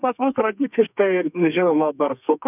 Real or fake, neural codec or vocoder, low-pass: fake; codec, 32 kHz, 1.9 kbps, SNAC; 3.6 kHz